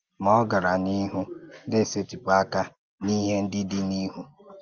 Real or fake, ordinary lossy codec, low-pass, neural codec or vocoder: real; Opus, 24 kbps; 7.2 kHz; none